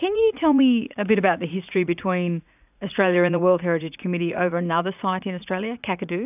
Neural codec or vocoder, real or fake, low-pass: vocoder, 44.1 kHz, 80 mel bands, Vocos; fake; 3.6 kHz